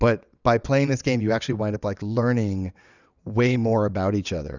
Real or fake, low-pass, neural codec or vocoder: fake; 7.2 kHz; vocoder, 22.05 kHz, 80 mel bands, WaveNeXt